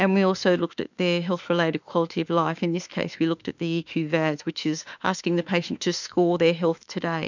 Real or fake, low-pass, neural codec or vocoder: fake; 7.2 kHz; autoencoder, 48 kHz, 32 numbers a frame, DAC-VAE, trained on Japanese speech